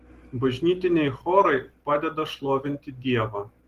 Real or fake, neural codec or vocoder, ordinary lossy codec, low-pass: real; none; Opus, 16 kbps; 14.4 kHz